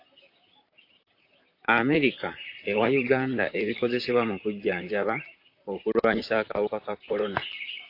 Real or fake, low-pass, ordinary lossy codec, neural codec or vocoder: fake; 5.4 kHz; AAC, 32 kbps; vocoder, 44.1 kHz, 128 mel bands, Pupu-Vocoder